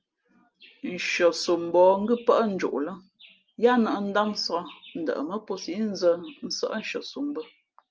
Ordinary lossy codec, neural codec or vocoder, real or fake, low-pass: Opus, 24 kbps; none; real; 7.2 kHz